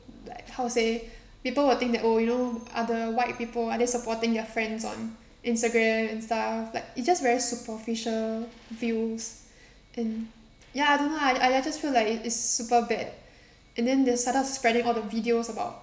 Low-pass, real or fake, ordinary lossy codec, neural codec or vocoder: none; real; none; none